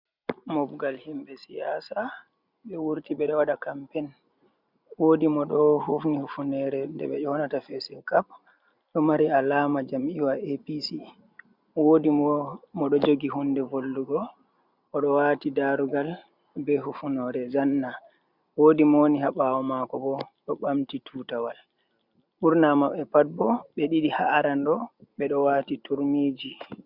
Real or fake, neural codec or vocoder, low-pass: real; none; 5.4 kHz